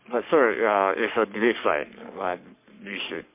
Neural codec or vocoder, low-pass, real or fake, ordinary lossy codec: codec, 44.1 kHz, 3.4 kbps, Pupu-Codec; 3.6 kHz; fake; MP3, 24 kbps